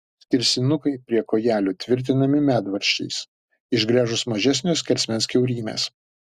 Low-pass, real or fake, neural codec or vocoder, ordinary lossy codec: 14.4 kHz; real; none; Opus, 64 kbps